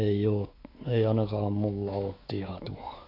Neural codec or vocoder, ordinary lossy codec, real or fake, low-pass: autoencoder, 48 kHz, 128 numbers a frame, DAC-VAE, trained on Japanese speech; AAC, 24 kbps; fake; 5.4 kHz